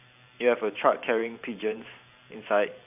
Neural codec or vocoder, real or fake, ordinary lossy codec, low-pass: vocoder, 44.1 kHz, 128 mel bands every 512 samples, BigVGAN v2; fake; none; 3.6 kHz